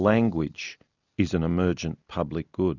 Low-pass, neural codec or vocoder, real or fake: 7.2 kHz; none; real